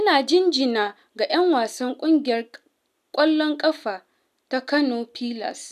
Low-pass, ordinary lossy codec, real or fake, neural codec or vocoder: 14.4 kHz; none; real; none